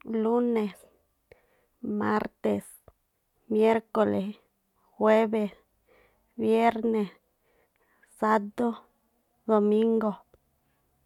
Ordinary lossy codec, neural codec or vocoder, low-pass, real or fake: none; none; 19.8 kHz; real